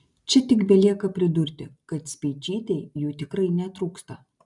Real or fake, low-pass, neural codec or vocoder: real; 10.8 kHz; none